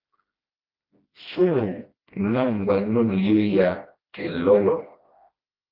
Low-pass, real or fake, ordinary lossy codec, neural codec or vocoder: 5.4 kHz; fake; Opus, 32 kbps; codec, 16 kHz, 1 kbps, FreqCodec, smaller model